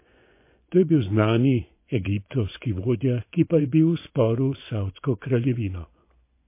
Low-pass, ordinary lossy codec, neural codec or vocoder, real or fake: 3.6 kHz; MP3, 24 kbps; vocoder, 24 kHz, 100 mel bands, Vocos; fake